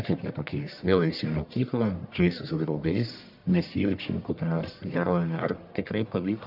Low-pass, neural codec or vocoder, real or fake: 5.4 kHz; codec, 44.1 kHz, 1.7 kbps, Pupu-Codec; fake